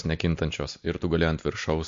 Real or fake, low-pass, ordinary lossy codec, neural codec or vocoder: real; 7.2 kHz; MP3, 48 kbps; none